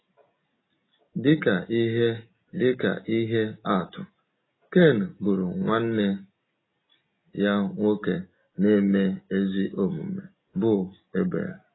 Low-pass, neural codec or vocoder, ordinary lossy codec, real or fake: 7.2 kHz; none; AAC, 16 kbps; real